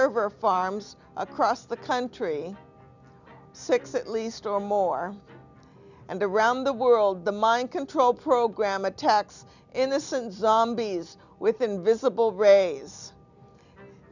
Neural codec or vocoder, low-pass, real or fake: none; 7.2 kHz; real